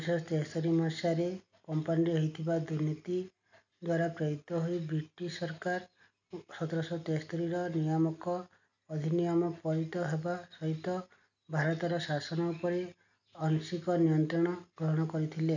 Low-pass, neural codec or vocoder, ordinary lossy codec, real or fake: 7.2 kHz; none; none; real